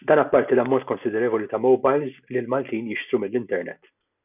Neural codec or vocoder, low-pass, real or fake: none; 3.6 kHz; real